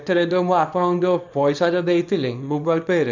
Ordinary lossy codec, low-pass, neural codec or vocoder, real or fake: none; 7.2 kHz; codec, 24 kHz, 0.9 kbps, WavTokenizer, small release; fake